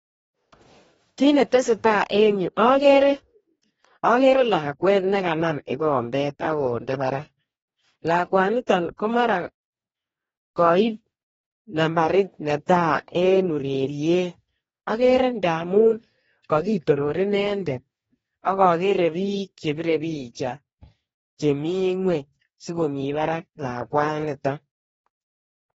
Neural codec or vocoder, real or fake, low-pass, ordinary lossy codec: codec, 44.1 kHz, 2.6 kbps, DAC; fake; 19.8 kHz; AAC, 24 kbps